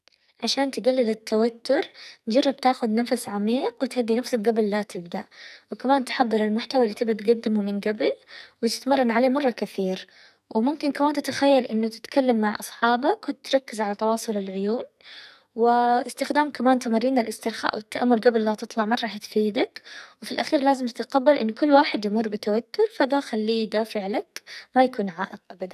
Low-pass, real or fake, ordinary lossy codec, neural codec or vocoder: 14.4 kHz; fake; none; codec, 44.1 kHz, 2.6 kbps, SNAC